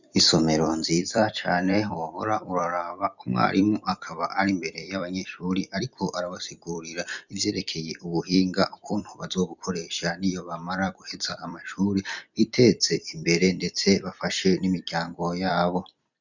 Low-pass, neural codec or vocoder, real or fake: 7.2 kHz; vocoder, 44.1 kHz, 128 mel bands every 256 samples, BigVGAN v2; fake